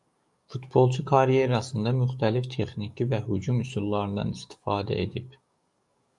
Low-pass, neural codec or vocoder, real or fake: 10.8 kHz; codec, 44.1 kHz, 7.8 kbps, DAC; fake